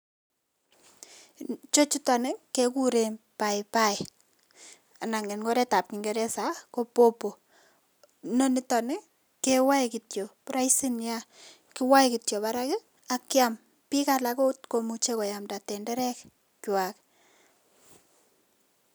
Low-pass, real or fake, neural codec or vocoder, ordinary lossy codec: none; real; none; none